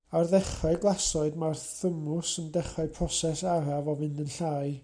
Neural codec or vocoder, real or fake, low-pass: none; real; 9.9 kHz